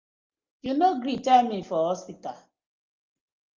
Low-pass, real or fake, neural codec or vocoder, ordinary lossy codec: 7.2 kHz; real; none; Opus, 32 kbps